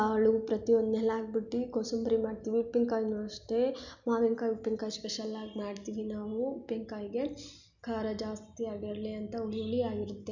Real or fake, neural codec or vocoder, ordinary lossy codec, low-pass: real; none; none; 7.2 kHz